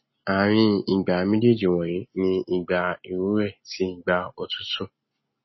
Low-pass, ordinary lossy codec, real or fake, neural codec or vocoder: 7.2 kHz; MP3, 24 kbps; real; none